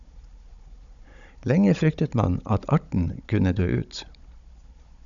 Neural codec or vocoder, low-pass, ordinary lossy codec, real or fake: codec, 16 kHz, 16 kbps, FunCodec, trained on Chinese and English, 50 frames a second; 7.2 kHz; MP3, 96 kbps; fake